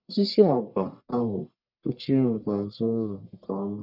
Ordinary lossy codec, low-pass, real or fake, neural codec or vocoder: none; 5.4 kHz; fake; codec, 44.1 kHz, 1.7 kbps, Pupu-Codec